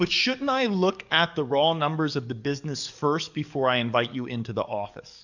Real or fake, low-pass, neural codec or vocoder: fake; 7.2 kHz; codec, 44.1 kHz, 7.8 kbps, DAC